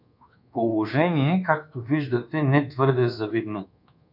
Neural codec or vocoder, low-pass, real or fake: codec, 24 kHz, 1.2 kbps, DualCodec; 5.4 kHz; fake